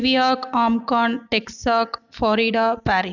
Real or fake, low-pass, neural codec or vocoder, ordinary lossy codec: real; 7.2 kHz; none; none